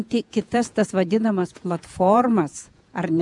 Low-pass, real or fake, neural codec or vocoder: 10.8 kHz; fake; vocoder, 48 kHz, 128 mel bands, Vocos